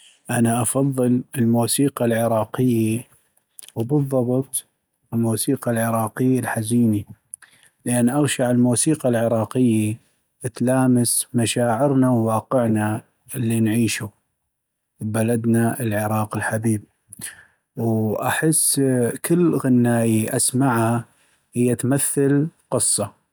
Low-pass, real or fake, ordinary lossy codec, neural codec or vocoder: none; real; none; none